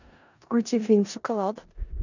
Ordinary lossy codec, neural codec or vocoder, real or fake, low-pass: none; codec, 16 kHz in and 24 kHz out, 0.4 kbps, LongCat-Audio-Codec, four codebook decoder; fake; 7.2 kHz